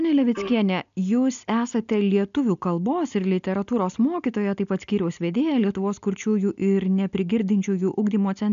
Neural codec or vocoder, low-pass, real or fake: none; 7.2 kHz; real